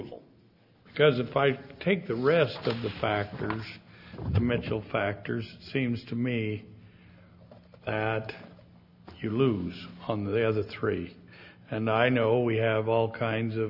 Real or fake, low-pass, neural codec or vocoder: real; 5.4 kHz; none